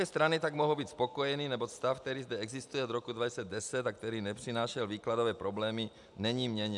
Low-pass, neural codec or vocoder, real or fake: 10.8 kHz; none; real